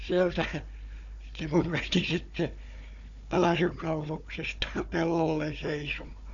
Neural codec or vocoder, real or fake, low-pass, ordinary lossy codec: codec, 16 kHz, 4 kbps, FunCodec, trained on Chinese and English, 50 frames a second; fake; 7.2 kHz; MP3, 96 kbps